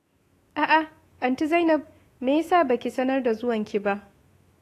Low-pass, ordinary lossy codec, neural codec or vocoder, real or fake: 14.4 kHz; AAC, 48 kbps; autoencoder, 48 kHz, 128 numbers a frame, DAC-VAE, trained on Japanese speech; fake